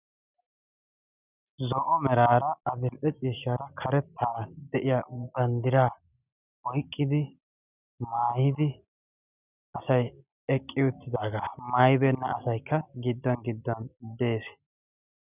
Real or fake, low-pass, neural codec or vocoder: real; 3.6 kHz; none